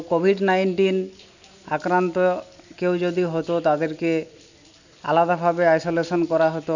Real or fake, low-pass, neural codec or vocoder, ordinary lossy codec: real; 7.2 kHz; none; none